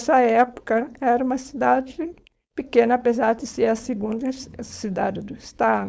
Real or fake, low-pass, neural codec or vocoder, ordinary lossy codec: fake; none; codec, 16 kHz, 4.8 kbps, FACodec; none